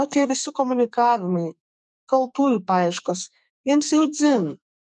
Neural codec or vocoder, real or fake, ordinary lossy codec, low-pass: codec, 44.1 kHz, 2.6 kbps, SNAC; fake; MP3, 96 kbps; 10.8 kHz